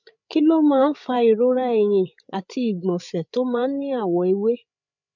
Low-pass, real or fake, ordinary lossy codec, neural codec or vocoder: 7.2 kHz; fake; none; codec, 16 kHz, 8 kbps, FreqCodec, larger model